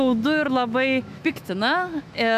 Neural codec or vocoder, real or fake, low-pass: autoencoder, 48 kHz, 128 numbers a frame, DAC-VAE, trained on Japanese speech; fake; 14.4 kHz